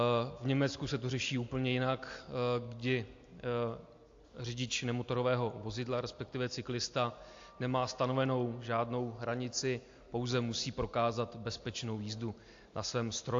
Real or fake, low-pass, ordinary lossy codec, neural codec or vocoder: real; 7.2 kHz; AAC, 48 kbps; none